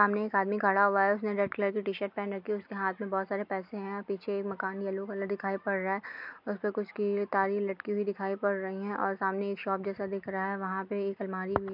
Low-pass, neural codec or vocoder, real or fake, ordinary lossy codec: 5.4 kHz; none; real; MP3, 48 kbps